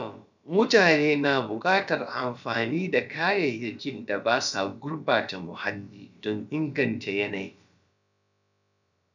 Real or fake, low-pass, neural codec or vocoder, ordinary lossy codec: fake; 7.2 kHz; codec, 16 kHz, about 1 kbps, DyCAST, with the encoder's durations; none